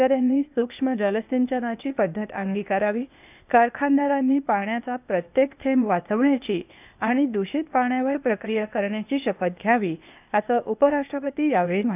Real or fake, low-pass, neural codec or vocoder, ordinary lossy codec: fake; 3.6 kHz; codec, 16 kHz, 0.8 kbps, ZipCodec; none